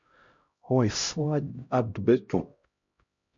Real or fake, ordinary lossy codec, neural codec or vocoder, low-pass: fake; MP3, 48 kbps; codec, 16 kHz, 0.5 kbps, X-Codec, HuBERT features, trained on LibriSpeech; 7.2 kHz